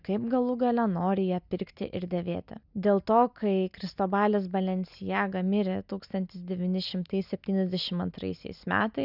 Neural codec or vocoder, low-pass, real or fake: none; 5.4 kHz; real